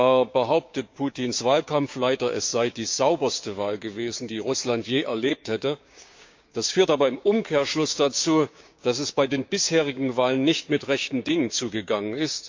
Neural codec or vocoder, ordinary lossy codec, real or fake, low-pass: codec, 16 kHz, 6 kbps, DAC; MP3, 64 kbps; fake; 7.2 kHz